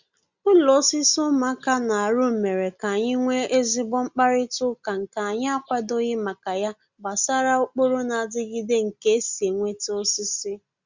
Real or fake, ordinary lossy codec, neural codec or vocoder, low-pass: real; Opus, 64 kbps; none; 7.2 kHz